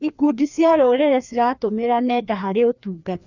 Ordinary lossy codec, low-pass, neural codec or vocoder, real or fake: none; 7.2 kHz; codec, 16 kHz, 2 kbps, FreqCodec, larger model; fake